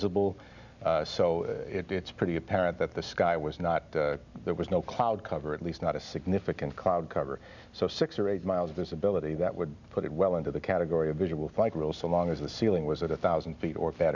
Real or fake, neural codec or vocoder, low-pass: real; none; 7.2 kHz